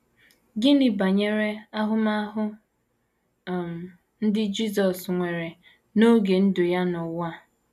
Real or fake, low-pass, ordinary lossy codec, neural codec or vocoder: real; 14.4 kHz; none; none